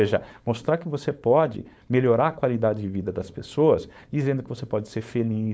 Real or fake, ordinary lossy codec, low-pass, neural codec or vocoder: fake; none; none; codec, 16 kHz, 4.8 kbps, FACodec